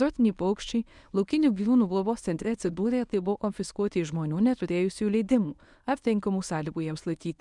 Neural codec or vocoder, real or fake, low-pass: codec, 24 kHz, 0.9 kbps, WavTokenizer, medium speech release version 1; fake; 10.8 kHz